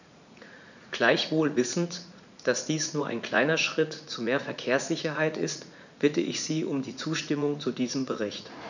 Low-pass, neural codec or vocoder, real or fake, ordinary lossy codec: 7.2 kHz; vocoder, 44.1 kHz, 80 mel bands, Vocos; fake; none